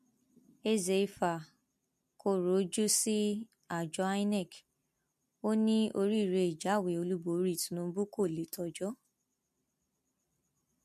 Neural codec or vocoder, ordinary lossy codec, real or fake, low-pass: none; MP3, 64 kbps; real; 14.4 kHz